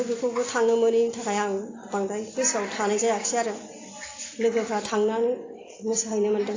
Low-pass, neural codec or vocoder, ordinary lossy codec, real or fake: 7.2 kHz; none; AAC, 32 kbps; real